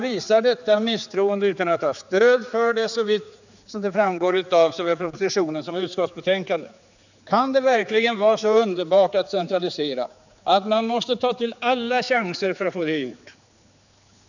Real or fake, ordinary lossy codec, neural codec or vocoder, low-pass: fake; none; codec, 16 kHz, 4 kbps, X-Codec, HuBERT features, trained on general audio; 7.2 kHz